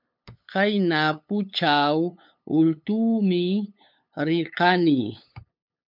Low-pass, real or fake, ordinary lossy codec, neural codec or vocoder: 5.4 kHz; fake; MP3, 48 kbps; codec, 16 kHz, 8 kbps, FunCodec, trained on LibriTTS, 25 frames a second